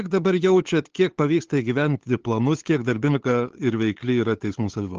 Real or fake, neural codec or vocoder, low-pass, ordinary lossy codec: fake; codec, 16 kHz, 8 kbps, FunCodec, trained on LibriTTS, 25 frames a second; 7.2 kHz; Opus, 16 kbps